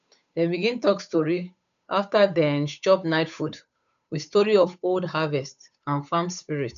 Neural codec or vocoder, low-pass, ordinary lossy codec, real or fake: codec, 16 kHz, 8 kbps, FunCodec, trained on Chinese and English, 25 frames a second; 7.2 kHz; none; fake